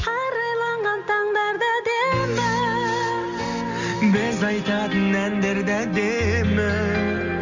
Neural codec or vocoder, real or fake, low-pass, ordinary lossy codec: none; real; 7.2 kHz; none